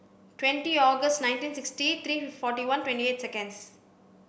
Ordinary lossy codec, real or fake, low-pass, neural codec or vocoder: none; real; none; none